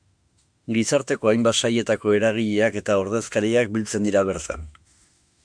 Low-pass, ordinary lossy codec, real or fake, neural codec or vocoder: 9.9 kHz; MP3, 96 kbps; fake; autoencoder, 48 kHz, 32 numbers a frame, DAC-VAE, trained on Japanese speech